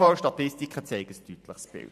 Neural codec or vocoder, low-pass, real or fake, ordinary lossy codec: vocoder, 44.1 kHz, 128 mel bands every 512 samples, BigVGAN v2; 14.4 kHz; fake; AAC, 96 kbps